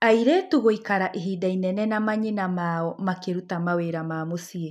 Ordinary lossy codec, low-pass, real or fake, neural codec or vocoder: none; 14.4 kHz; real; none